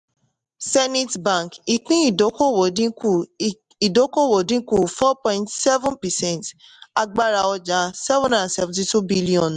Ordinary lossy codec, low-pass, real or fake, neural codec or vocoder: MP3, 96 kbps; 10.8 kHz; real; none